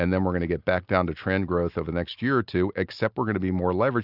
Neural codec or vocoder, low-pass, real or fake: none; 5.4 kHz; real